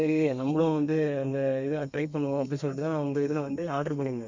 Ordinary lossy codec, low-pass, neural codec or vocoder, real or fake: MP3, 64 kbps; 7.2 kHz; codec, 32 kHz, 1.9 kbps, SNAC; fake